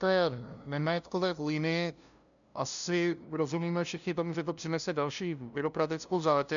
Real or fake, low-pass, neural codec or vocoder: fake; 7.2 kHz; codec, 16 kHz, 0.5 kbps, FunCodec, trained on LibriTTS, 25 frames a second